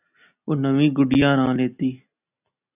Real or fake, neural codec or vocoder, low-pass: real; none; 3.6 kHz